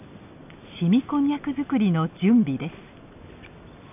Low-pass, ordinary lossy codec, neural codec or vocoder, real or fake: 3.6 kHz; none; none; real